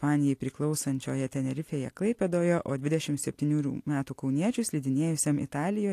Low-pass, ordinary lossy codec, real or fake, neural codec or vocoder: 14.4 kHz; AAC, 64 kbps; real; none